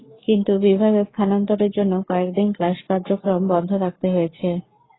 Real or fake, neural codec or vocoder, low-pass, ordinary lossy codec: fake; codec, 44.1 kHz, 7.8 kbps, Pupu-Codec; 7.2 kHz; AAC, 16 kbps